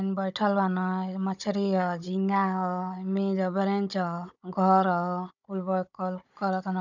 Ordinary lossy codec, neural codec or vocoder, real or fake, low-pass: none; codec, 16 kHz, 16 kbps, FunCodec, trained on Chinese and English, 50 frames a second; fake; 7.2 kHz